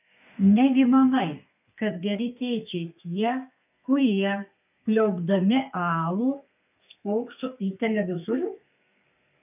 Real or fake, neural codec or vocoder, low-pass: fake; codec, 32 kHz, 1.9 kbps, SNAC; 3.6 kHz